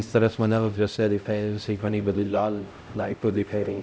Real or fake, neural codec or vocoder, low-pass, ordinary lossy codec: fake; codec, 16 kHz, 0.5 kbps, X-Codec, HuBERT features, trained on LibriSpeech; none; none